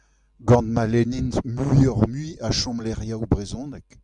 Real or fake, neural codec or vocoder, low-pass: real; none; 10.8 kHz